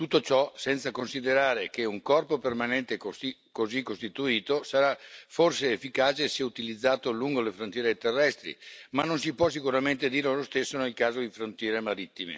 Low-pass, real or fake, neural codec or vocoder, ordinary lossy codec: none; real; none; none